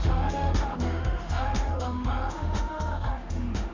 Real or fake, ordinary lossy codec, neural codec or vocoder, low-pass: fake; MP3, 64 kbps; autoencoder, 48 kHz, 32 numbers a frame, DAC-VAE, trained on Japanese speech; 7.2 kHz